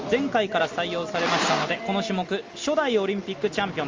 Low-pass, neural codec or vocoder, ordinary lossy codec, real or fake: 7.2 kHz; none; Opus, 32 kbps; real